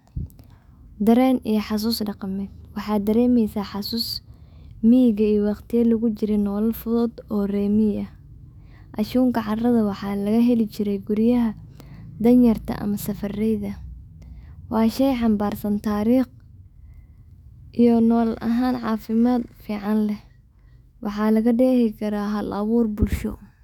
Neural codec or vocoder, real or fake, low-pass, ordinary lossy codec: autoencoder, 48 kHz, 128 numbers a frame, DAC-VAE, trained on Japanese speech; fake; 19.8 kHz; none